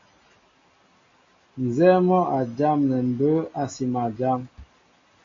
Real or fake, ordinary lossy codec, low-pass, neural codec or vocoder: real; AAC, 32 kbps; 7.2 kHz; none